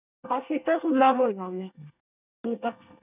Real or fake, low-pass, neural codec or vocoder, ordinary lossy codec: fake; 3.6 kHz; codec, 24 kHz, 1 kbps, SNAC; none